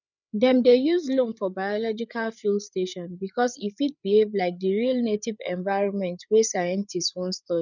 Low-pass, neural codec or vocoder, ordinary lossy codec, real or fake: 7.2 kHz; codec, 16 kHz, 8 kbps, FreqCodec, larger model; none; fake